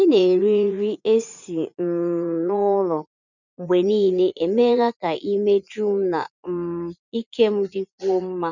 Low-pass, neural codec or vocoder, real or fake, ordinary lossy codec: 7.2 kHz; vocoder, 44.1 kHz, 80 mel bands, Vocos; fake; none